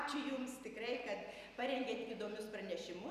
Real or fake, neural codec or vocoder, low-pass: real; none; 14.4 kHz